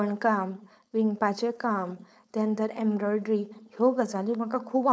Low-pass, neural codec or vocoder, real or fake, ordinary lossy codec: none; codec, 16 kHz, 4.8 kbps, FACodec; fake; none